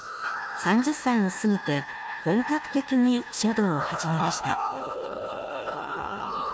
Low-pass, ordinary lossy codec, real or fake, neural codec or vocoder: none; none; fake; codec, 16 kHz, 1 kbps, FunCodec, trained on Chinese and English, 50 frames a second